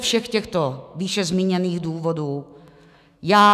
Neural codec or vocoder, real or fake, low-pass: autoencoder, 48 kHz, 128 numbers a frame, DAC-VAE, trained on Japanese speech; fake; 14.4 kHz